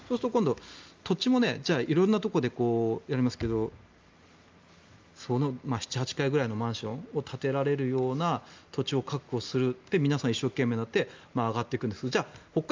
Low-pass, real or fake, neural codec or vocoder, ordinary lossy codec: 7.2 kHz; real; none; Opus, 24 kbps